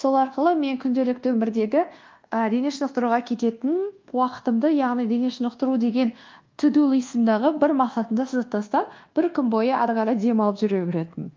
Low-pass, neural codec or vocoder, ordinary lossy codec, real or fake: 7.2 kHz; codec, 24 kHz, 1.2 kbps, DualCodec; Opus, 32 kbps; fake